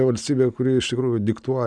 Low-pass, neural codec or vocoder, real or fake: 9.9 kHz; none; real